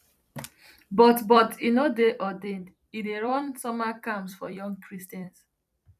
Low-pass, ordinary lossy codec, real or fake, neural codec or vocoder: 14.4 kHz; none; fake; vocoder, 44.1 kHz, 128 mel bands every 256 samples, BigVGAN v2